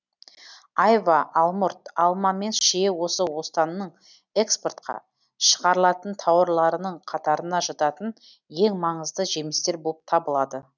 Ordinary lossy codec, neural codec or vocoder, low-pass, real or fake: none; none; 7.2 kHz; real